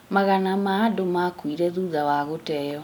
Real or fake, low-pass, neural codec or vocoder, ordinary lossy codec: real; none; none; none